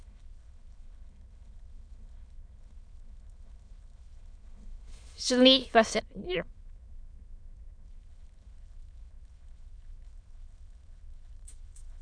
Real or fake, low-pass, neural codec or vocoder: fake; 9.9 kHz; autoencoder, 22.05 kHz, a latent of 192 numbers a frame, VITS, trained on many speakers